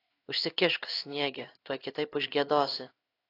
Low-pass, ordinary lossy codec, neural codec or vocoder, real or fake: 5.4 kHz; AAC, 32 kbps; codec, 16 kHz in and 24 kHz out, 1 kbps, XY-Tokenizer; fake